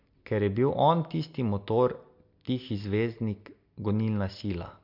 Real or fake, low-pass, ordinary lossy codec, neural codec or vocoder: real; 5.4 kHz; MP3, 48 kbps; none